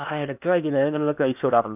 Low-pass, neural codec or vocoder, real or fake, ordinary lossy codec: 3.6 kHz; codec, 16 kHz in and 24 kHz out, 0.8 kbps, FocalCodec, streaming, 65536 codes; fake; none